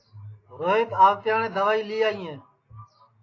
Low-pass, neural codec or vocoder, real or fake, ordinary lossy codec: 7.2 kHz; none; real; AAC, 32 kbps